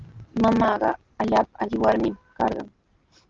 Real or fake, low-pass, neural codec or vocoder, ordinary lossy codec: real; 7.2 kHz; none; Opus, 16 kbps